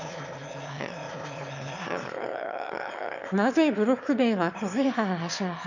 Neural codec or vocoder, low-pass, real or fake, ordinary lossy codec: autoencoder, 22.05 kHz, a latent of 192 numbers a frame, VITS, trained on one speaker; 7.2 kHz; fake; none